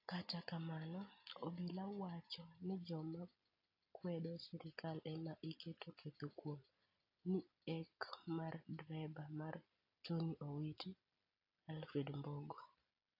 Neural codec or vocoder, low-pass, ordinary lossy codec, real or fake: none; 5.4 kHz; AAC, 32 kbps; real